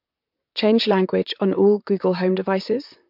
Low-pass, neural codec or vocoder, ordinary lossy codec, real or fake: 5.4 kHz; vocoder, 44.1 kHz, 128 mel bands, Pupu-Vocoder; MP3, 48 kbps; fake